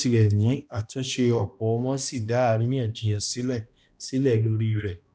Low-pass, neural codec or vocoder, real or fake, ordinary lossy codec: none; codec, 16 kHz, 1 kbps, X-Codec, HuBERT features, trained on balanced general audio; fake; none